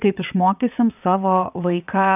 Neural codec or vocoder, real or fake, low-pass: vocoder, 22.05 kHz, 80 mel bands, WaveNeXt; fake; 3.6 kHz